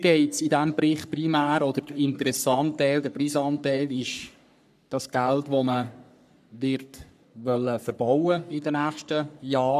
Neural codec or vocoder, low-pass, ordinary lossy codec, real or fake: codec, 44.1 kHz, 3.4 kbps, Pupu-Codec; 14.4 kHz; none; fake